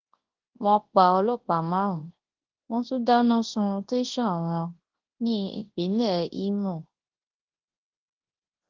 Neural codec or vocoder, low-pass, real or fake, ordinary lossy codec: codec, 24 kHz, 0.9 kbps, WavTokenizer, large speech release; 7.2 kHz; fake; Opus, 16 kbps